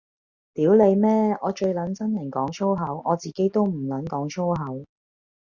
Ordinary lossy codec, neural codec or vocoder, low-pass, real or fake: Opus, 64 kbps; none; 7.2 kHz; real